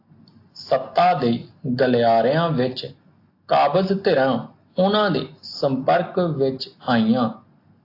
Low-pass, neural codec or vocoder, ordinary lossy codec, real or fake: 5.4 kHz; none; AAC, 32 kbps; real